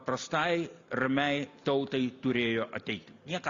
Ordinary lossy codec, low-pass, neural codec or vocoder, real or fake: Opus, 64 kbps; 7.2 kHz; none; real